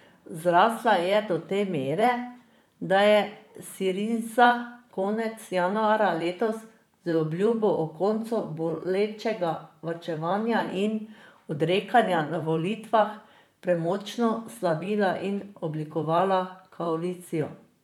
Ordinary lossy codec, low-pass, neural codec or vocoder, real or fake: none; 19.8 kHz; vocoder, 44.1 kHz, 128 mel bands, Pupu-Vocoder; fake